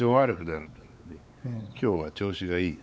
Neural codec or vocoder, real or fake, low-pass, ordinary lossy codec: codec, 16 kHz, 4 kbps, X-Codec, WavLM features, trained on Multilingual LibriSpeech; fake; none; none